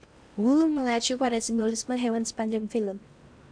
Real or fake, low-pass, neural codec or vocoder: fake; 9.9 kHz; codec, 16 kHz in and 24 kHz out, 0.8 kbps, FocalCodec, streaming, 65536 codes